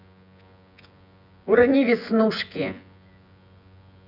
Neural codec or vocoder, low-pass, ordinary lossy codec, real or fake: vocoder, 24 kHz, 100 mel bands, Vocos; 5.4 kHz; none; fake